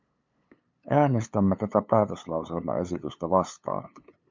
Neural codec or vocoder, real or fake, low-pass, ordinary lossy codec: codec, 16 kHz, 8 kbps, FunCodec, trained on LibriTTS, 25 frames a second; fake; 7.2 kHz; MP3, 64 kbps